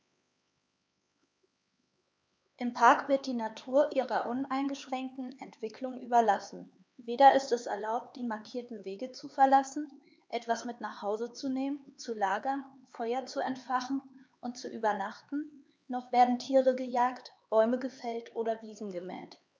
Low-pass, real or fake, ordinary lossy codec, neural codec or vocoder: none; fake; none; codec, 16 kHz, 4 kbps, X-Codec, HuBERT features, trained on LibriSpeech